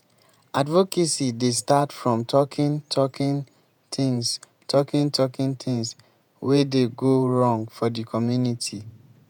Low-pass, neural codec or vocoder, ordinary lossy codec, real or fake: none; vocoder, 48 kHz, 128 mel bands, Vocos; none; fake